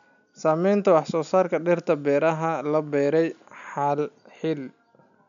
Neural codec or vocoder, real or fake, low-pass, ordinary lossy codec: none; real; 7.2 kHz; none